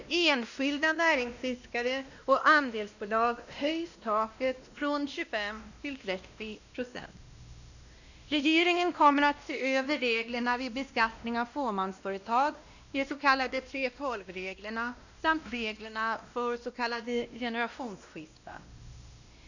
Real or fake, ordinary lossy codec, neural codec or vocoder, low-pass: fake; none; codec, 16 kHz, 1 kbps, X-Codec, WavLM features, trained on Multilingual LibriSpeech; 7.2 kHz